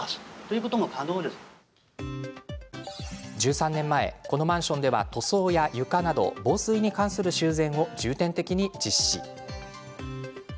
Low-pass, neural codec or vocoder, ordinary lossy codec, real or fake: none; none; none; real